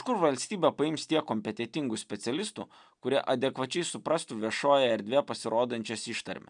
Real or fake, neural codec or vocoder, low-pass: real; none; 9.9 kHz